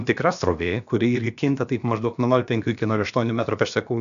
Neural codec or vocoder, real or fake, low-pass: codec, 16 kHz, about 1 kbps, DyCAST, with the encoder's durations; fake; 7.2 kHz